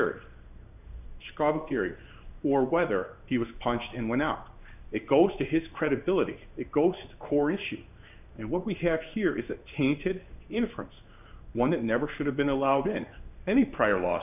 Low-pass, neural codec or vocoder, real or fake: 3.6 kHz; codec, 16 kHz in and 24 kHz out, 1 kbps, XY-Tokenizer; fake